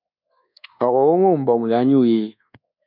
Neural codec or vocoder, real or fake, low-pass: codec, 24 kHz, 1.2 kbps, DualCodec; fake; 5.4 kHz